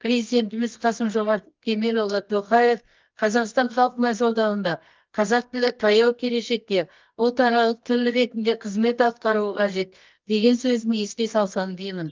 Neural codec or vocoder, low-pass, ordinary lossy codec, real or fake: codec, 24 kHz, 0.9 kbps, WavTokenizer, medium music audio release; 7.2 kHz; Opus, 32 kbps; fake